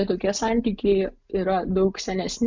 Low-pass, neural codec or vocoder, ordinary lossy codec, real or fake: 7.2 kHz; none; MP3, 64 kbps; real